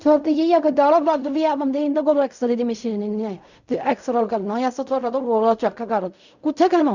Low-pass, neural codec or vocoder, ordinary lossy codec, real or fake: 7.2 kHz; codec, 16 kHz in and 24 kHz out, 0.4 kbps, LongCat-Audio-Codec, fine tuned four codebook decoder; none; fake